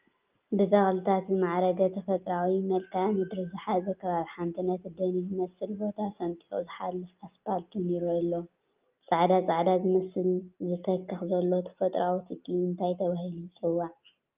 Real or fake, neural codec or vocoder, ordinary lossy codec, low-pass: real; none; Opus, 24 kbps; 3.6 kHz